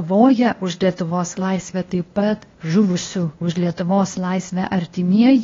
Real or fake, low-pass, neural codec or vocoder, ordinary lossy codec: fake; 7.2 kHz; codec, 16 kHz, 0.8 kbps, ZipCodec; AAC, 32 kbps